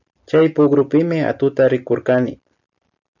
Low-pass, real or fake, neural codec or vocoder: 7.2 kHz; real; none